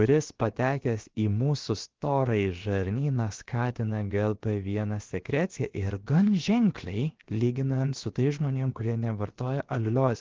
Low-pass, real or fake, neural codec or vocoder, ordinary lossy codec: 7.2 kHz; fake; codec, 16 kHz, 0.7 kbps, FocalCodec; Opus, 16 kbps